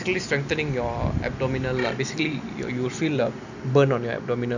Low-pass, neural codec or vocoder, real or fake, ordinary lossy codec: 7.2 kHz; none; real; none